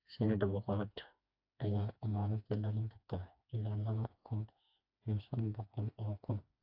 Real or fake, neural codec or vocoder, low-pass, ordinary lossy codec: fake; codec, 16 kHz, 2 kbps, FreqCodec, smaller model; 5.4 kHz; AAC, 32 kbps